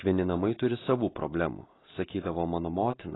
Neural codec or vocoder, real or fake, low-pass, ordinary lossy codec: none; real; 7.2 kHz; AAC, 16 kbps